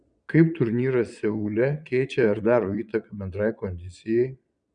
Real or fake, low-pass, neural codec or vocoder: fake; 9.9 kHz; vocoder, 22.05 kHz, 80 mel bands, Vocos